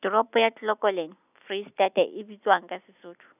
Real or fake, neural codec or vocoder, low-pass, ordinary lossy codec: real; none; 3.6 kHz; none